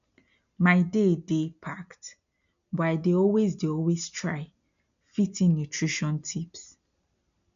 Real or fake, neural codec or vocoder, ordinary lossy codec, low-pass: real; none; none; 7.2 kHz